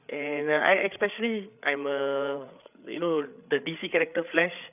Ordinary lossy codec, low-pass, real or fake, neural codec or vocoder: none; 3.6 kHz; fake; codec, 16 kHz, 8 kbps, FreqCodec, larger model